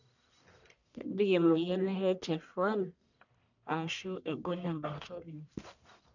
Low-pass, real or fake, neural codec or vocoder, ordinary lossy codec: 7.2 kHz; fake; codec, 44.1 kHz, 1.7 kbps, Pupu-Codec; none